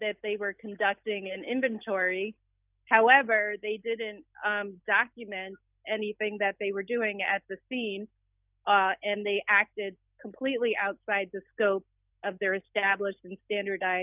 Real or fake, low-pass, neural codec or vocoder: real; 3.6 kHz; none